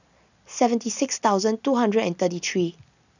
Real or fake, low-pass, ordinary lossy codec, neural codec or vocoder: real; 7.2 kHz; none; none